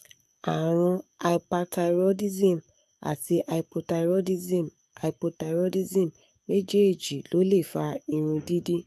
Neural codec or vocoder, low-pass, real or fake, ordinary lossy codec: codec, 44.1 kHz, 7.8 kbps, DAC; 14.4 kHz; fake; AAC, 96 kbps